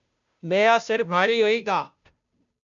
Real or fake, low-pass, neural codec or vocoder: fake; 7.2 kHz; codec, 16 kHz, 0.5 kbps, FunCodec, trained on Chinese and English, 25 frames a second